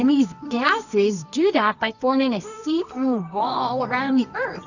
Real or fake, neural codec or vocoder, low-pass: fake; codec, 24 kHz, 0.9 kbps, WavTokenizer, medium music audio release; 7.2 kHz